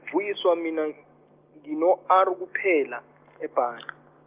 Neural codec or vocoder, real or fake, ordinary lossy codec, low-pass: none; real; Opus, 24 kbps; 3.6 kHz